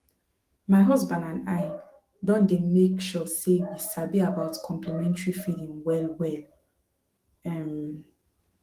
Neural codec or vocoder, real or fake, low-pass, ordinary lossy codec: autoencoder, 48 kHz, 128 numbers a frame, DAC-VAE, trained on Japanese speech; fake; 14.4 kHz; Opus, 24 kbps